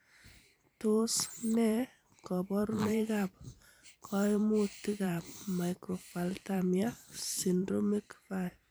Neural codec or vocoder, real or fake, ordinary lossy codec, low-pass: vocoder, 44.1 kHz, 128 mel bands every 512 samples, BigVGAN v2; fake; none; none